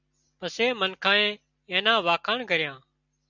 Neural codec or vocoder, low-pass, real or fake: none; 7.2 kHz; real